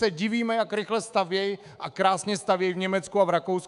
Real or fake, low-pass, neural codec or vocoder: fake; 10.8 kHz; codec, 24 kHz, 3.1 kbps, DualCodec